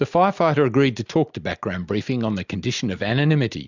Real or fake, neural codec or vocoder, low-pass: real; none; 7.2 kHz